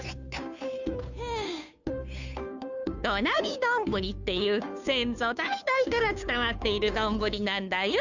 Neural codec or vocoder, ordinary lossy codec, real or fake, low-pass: codec, 16 kHz, 2 kbps, FunCodec, trained on Chinese and English, 25 frames a second; none; fake; 7.2 kHz